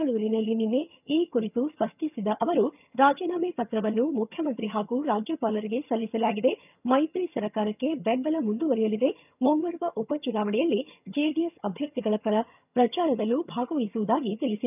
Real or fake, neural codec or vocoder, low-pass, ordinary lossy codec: fake; vocoder, 22.05 kHz, 80 mel bands, HiFi-GAN; 3.6 kHz; none